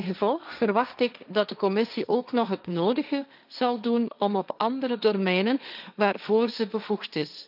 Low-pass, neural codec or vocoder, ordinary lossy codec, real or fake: 5.4 kHz; codec, 16 kHz, 1.1 kbps, Voila-Tokenizer; none; fake